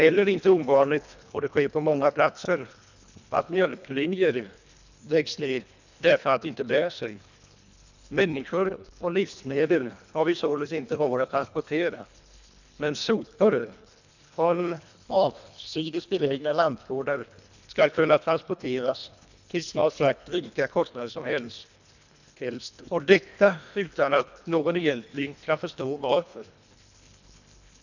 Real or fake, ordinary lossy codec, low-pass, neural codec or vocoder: fake; none; 7.2 kHz; codec, 24 kHz, 1.5 kbps, HILCodec